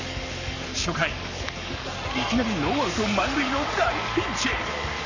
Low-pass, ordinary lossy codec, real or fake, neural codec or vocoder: 7.2 kHz; none; fake; codec, 44.1 kHz, 7.8 kbps, Pupu-Codec